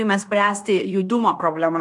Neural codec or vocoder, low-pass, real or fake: codec, 16 kHz in and 24 kHz out, 0.9 kbps, LongCat-Audio-Codec, fine tuned four codebook decoder; 10.8 kHz; fake